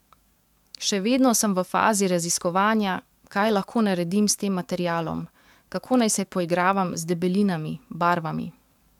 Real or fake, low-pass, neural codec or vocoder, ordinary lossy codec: fake; 19.8 kHz; codec, 44.1 kHz, 7.8 kbps, DAC; MP3, 96 kbps